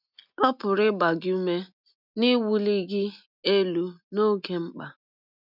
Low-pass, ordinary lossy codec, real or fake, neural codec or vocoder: 5.4 kHz; none; real; none